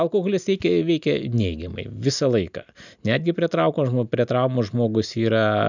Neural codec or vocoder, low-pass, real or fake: none; 7.2 kHz; real